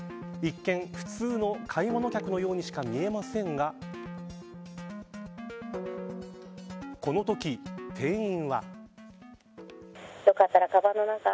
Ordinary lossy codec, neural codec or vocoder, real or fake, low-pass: none; none; real; none